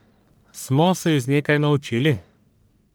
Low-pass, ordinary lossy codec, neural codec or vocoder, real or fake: none; none; codec, 44.1 kHz, 1.7 kbps, Pupu-Codec; fake